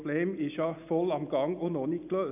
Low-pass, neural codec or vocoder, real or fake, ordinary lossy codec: 3.6 kHz; none; real; none